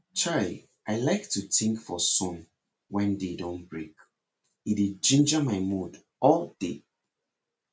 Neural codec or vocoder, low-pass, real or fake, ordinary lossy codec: none; none; real; none